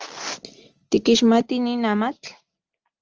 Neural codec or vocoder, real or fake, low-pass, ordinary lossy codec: none; real; 7.2 kHz; Opus, 24 kbps